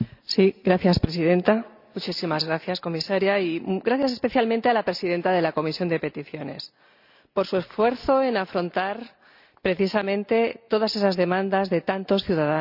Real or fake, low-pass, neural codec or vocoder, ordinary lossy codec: real; 5.4 kHz; none; none